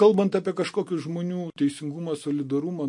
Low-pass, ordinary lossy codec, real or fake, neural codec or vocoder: 10.8 kHz; MP3, 48 kbps; real; none